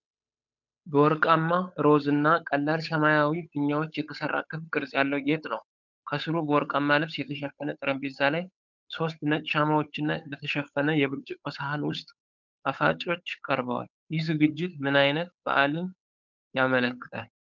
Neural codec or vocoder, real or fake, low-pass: codec, 16 kHz, 8 kbps, FunCodec, trained on Chinese and English, 25 frames a second; fake; 7.2 kHz